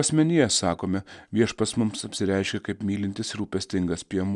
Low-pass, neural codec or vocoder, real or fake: 10.8 kHz; none; real